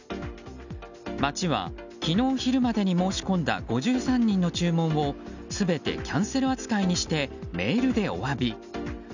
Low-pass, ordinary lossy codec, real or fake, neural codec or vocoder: 7.2 kHz; none; real; none